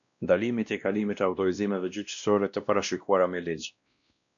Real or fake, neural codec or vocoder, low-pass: fake; codec, 16 kHz, 1 kbps, X-Codec, WavLM features, trained on Multilingual LibriSpeech; 7.2 kHz